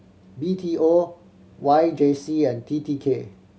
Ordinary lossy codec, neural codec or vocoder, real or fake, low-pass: none; none; real; none